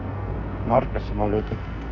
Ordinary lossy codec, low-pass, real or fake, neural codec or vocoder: none; 7.2 kHz; fake; codec, 44.1 kHz, 2.6 kbps, SNAC